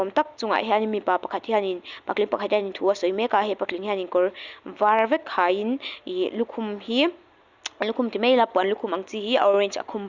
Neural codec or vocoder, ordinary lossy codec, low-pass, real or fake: none; Opus, 64 kbps; 7.2 kHz; real